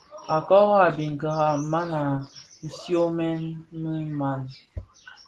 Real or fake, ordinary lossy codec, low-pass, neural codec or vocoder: real; Opus, 16 kbps; 10.8 kHz; none